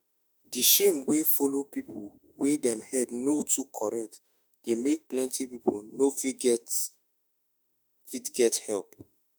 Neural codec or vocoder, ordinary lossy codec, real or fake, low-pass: autoencoder, 48 kHz, 32 numbers a frame, DAC-VAE, trained on Japanese speech; none; fake; none